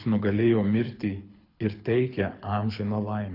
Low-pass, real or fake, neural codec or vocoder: 5.4 kHz; fake; codec, 24 kHz, 6 kbps, HILCodec